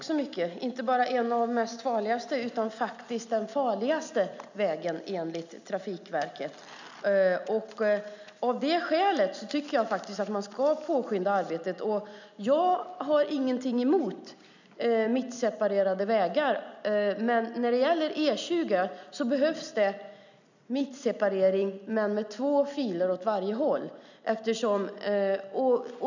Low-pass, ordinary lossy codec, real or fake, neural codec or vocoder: 7.2 kHz; none; real; none